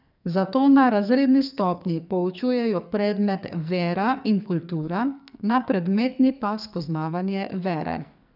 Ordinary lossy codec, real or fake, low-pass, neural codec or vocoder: none; fake; 5.4 kHz; codec, 44.1 kHz, 2.6 kbps, SNAC